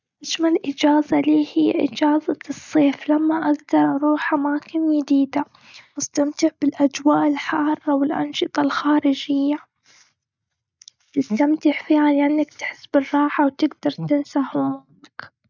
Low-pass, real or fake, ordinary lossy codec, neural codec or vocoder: 7.2 kHz; real; none; none